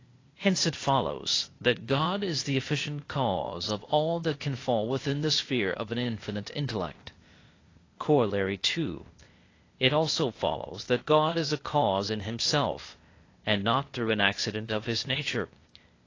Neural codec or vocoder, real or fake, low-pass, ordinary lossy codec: codec, 16 kHz, 0.8 kbps, ZipCodec; fake; 7.2 kHz; AAC, 32 kbps